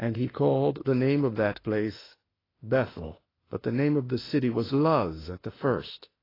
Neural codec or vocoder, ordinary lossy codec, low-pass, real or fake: autoencoder, 48 kHz, 32 numbers a frame, DAC-VAE, trained on Japanese speech; AAC, 24 kbps; 5.4 kHz; fake